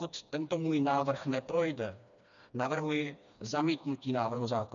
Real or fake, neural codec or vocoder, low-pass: fake; codec, 16 kHz, 2 kbps, FreqCodec, smaller model; 7.2 kHz